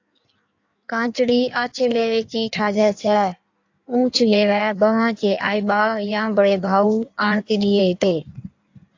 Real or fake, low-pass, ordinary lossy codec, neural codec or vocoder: fake; 7.2 kHz; AAC, 48 kbps; codec, 16 kHz in and 24 kHz out, 1.1 kbps, FireRedTTS-2 codec